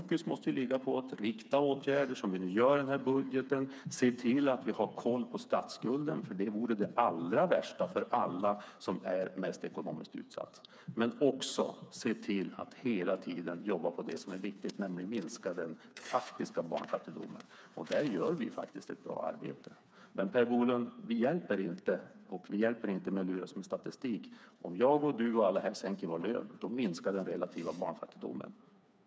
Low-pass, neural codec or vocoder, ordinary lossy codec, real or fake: none; codec, 16 kHz, 4 kbps, FreqCodec, smaller model; none; fake